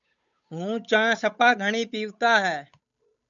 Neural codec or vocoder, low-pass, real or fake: codec, 16 kHz, 8 kbps, FunCodec, trained on Chinese and English, 25 frames a second; 7.2 kHz; fake